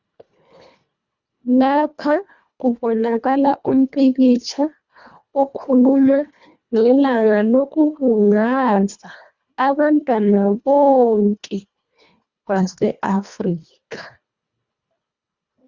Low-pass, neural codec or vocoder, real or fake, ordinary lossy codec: 7.2 kHz; codec, 24 kHz, 1.5 kbps, HILCodec; fake; Opus, 64 kbps